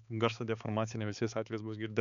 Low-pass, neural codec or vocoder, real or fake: 7.2 kHz; codec, 16 kHz, 4 kbps, X-Codec, HuBERT features, trained on balanced general audio; fake